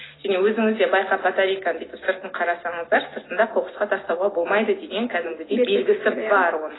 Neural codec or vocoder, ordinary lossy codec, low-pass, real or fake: none; AAC, 16 kbps; 7.2 kHz; real